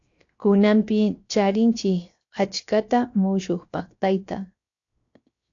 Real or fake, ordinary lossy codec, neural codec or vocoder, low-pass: fake; AAC, 48 kbps; codec, 16 kHz, 0.7 kbps, FocalCodec; 7.2 kHz